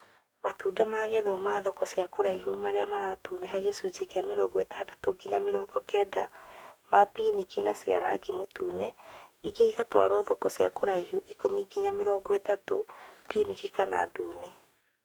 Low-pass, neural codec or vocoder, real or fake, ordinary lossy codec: none; codec, 44.1 kHz, 2.6 kbps, DAC; fake; none